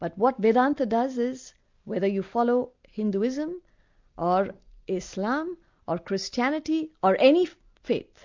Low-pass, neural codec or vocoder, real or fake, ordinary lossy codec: 7.2 kHz; none; real; MP3, 64 kbps